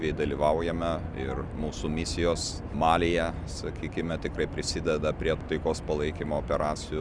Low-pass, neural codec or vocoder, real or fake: 9.9 kHz; none; real